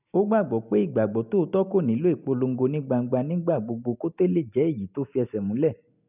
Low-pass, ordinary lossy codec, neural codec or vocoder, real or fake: 3.6 kHz; none; none; real